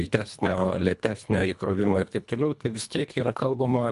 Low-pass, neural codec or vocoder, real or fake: 10.8 kHz; codec, 24 kHz, 1.5 kbps, HILCodec; fake